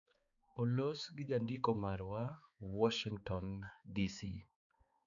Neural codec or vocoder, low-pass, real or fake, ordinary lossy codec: codec, 16 kHz, 4 kbps, X-Codec, HuBERT features, trained on balanced general audio; 7.2 kHz; fake; none